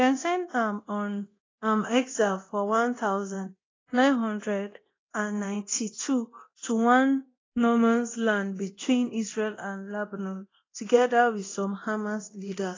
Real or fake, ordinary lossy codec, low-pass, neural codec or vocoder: fake; AAC, 32 kbps; 7.2 kHz; codec, 24 kHz, 0.9 kbps, DualCodec